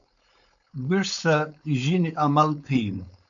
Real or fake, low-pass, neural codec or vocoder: fake; 7.2 kHz; codec, 16 kHz, 4.8 kbps, FACodec